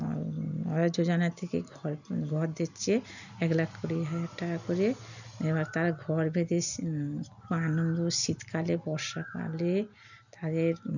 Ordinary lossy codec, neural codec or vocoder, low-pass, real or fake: none; none; 7.2 kHz; real